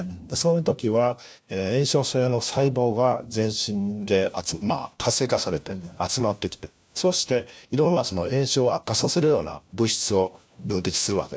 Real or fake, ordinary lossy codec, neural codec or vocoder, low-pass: fake; none; codec, 16 kHz, 1 kbps, FunCodec, trained on LibriTTS, 50 frames a second; none